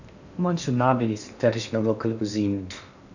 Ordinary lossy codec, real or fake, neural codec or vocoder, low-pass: none; fake; codec, 16 kHz in and 24 kHz out, 0.8 kbps, FocalCodec, streaming, 65536 codes; 7.2 kHz